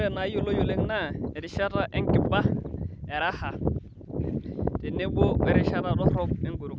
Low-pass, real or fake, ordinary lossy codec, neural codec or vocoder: none; real; none; none